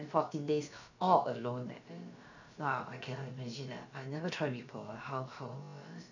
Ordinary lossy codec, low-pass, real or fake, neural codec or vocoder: none; 7.2 kHz; fake; codec, 16 kHz, about 1 kbps, DyCAST, with the encoder's durations